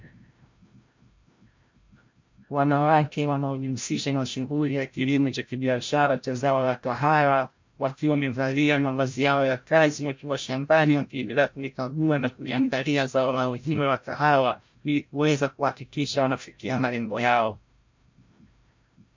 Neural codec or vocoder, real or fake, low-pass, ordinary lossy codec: codec, 16 kHz, 0.5 kbps, FreqCodec, larger model; fake; 7.2 kHz; MP3, 48 kbps